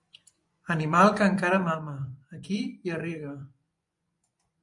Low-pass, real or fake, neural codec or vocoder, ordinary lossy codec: 10.8 kHz; fake; vocoder, 24 kHz, 100 mel bands, Vocos; MP3, 64 kbps